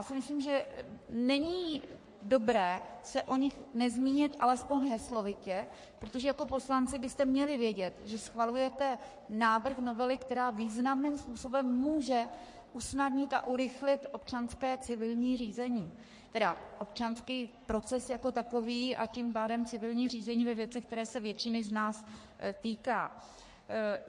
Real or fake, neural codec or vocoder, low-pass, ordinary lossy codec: fake; codec, 44.1 kHz, 3.4 kbps, Pupu-Codec; 10.8 kHz; MP3, 48 kbps